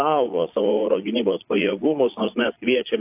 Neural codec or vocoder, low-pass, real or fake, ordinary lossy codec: vocoder, 22.05 kHz, 80 mel bands, Vocos; 3.6 kHz; fake; AAC, 32 kbps